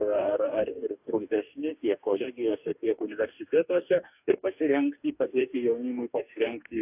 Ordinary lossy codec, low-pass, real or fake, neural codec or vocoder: AAC, 32 kbps; 3.6 kHz; fake; codec, 44.1 kHz, 2.6 kbps, DAC